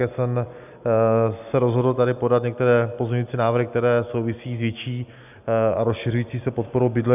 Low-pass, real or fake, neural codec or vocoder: 3.6 kHz; real; none